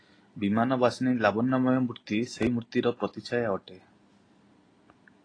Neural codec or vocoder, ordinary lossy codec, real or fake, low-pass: none; AAC, 32 kbps; real; 9.9 kHz